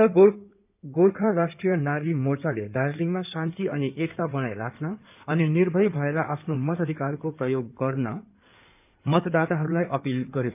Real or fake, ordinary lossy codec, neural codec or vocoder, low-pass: fake; none; codec, 16 kHz in and 24 kHz out, 2.2 kbps, FireRedTTS-2 codec; 3.6 kHz